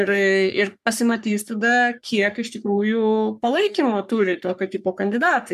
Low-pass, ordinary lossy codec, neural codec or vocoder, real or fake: 14.4 kHz; MP3, 96 kbps; codec, 44.1 kHz, 3.4 kbps, Pupu-Codec; fake